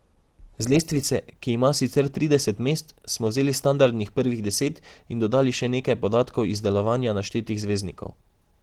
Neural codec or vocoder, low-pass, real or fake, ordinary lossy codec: none; 19.8 kHz; real; Opus, 16 kbps